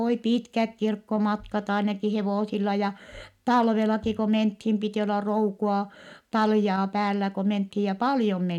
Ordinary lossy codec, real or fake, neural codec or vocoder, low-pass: none; real; none; 19.8 kHz